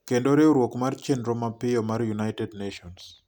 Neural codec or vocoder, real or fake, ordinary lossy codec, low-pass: none; real; none; 19.8 kHz